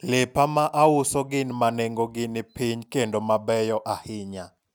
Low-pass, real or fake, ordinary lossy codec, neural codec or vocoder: none; real; none; none